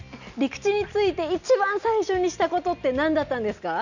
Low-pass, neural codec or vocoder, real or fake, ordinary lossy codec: 7.2 kHz; none; real; none